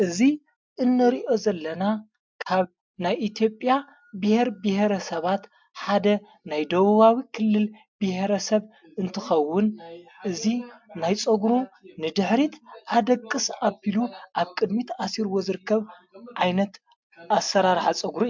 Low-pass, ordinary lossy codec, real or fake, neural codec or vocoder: 7.2 kHz; MP3, 64 kbps; real; none